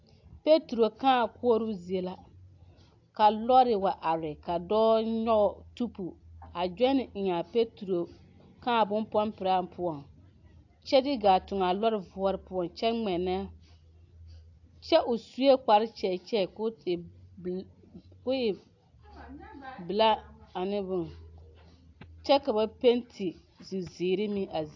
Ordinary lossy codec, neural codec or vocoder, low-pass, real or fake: AAC, 48 kbps; none; 7.2 kHz; real